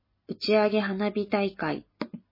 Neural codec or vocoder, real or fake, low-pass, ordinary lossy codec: none; real; 5.4 kHz; MP3, 24 kbps